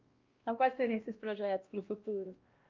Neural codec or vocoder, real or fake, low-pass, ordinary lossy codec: codec, 16 kHz, 1 kbps, X-Codec, WavLM features, trained on Multilingual LibriSpeech; fake; 7.2 kHz; Opus, 24 kbps